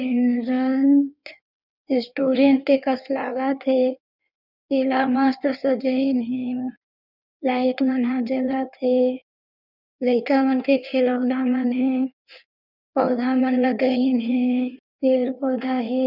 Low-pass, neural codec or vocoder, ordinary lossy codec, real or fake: 5.4 kHz; codec, 16 kHz in and 24 kHz out, 1.1 kbps, FireRedTTS-2 codec; none; fake